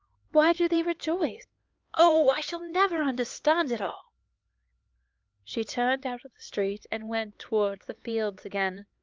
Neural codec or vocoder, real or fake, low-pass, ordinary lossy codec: codec, 16 kHz, 4 kbps, X-Codec, HuBERT features, trained on LibriSpeech; fake; 7.2 kHz; Opus, 32 kbps